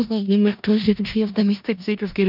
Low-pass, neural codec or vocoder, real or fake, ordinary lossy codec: 5.4 kHz; codec, 16 kHz in and 24 kHz out, 0.4 kbps, LongCat-Audio-Codec, four codebook decoder; fake; none